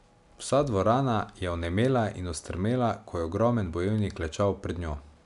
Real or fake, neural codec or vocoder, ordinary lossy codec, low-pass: real; none; none; 10.8 kHz